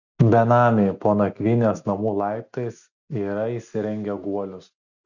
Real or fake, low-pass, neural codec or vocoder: real; 7.2 kHz; none